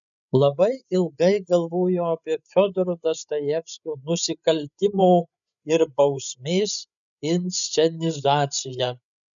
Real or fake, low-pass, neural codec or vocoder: fake; 7.2 kHz; codec, 16 kHz, 8 kbps, FreqCodec, larger model